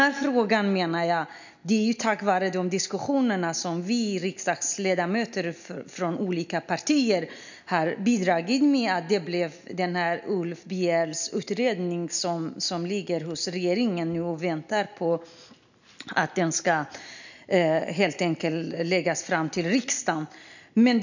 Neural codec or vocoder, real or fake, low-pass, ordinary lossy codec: none; real; 7.2 kHz; none